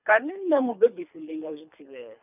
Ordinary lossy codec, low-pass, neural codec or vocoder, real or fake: none; 3.6 kHz; codec, 24 kHz, 3 kbps, HILCodec; fake